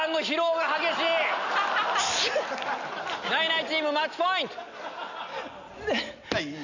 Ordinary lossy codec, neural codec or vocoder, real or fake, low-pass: none; none; real; 7.2 kHz